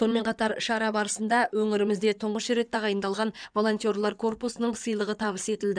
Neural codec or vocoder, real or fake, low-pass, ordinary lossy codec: codec, 16 kHz in and 24 kHz out, 2.2 kbps, FireRedTTS-2 codec; fake; 9.9 kHz; none